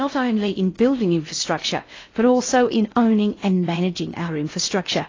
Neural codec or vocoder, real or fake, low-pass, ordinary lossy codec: codec, 16 kHz in and 24 kHz out, 0.6 kbps, FocalCodec, streaming, 2048 codes; fake; 7.2 kHz; AAC, 32 kbps